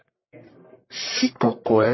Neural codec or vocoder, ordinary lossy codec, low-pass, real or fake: codec, 44.1 kHz, 1.7 kbps, Pupu-Codec; MP3, 24 kbps; 7.2 kHz; fake